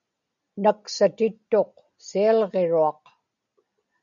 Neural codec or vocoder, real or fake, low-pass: none; real; 7.2 kHz